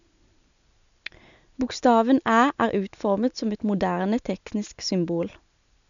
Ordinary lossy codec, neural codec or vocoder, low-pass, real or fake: none; none; 7.2 kHz; real